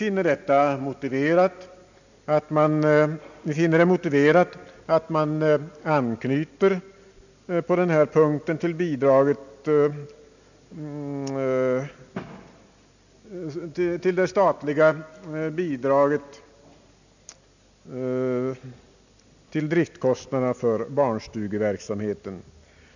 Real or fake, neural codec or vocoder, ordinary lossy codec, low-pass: real; none; none; 7.2 kHz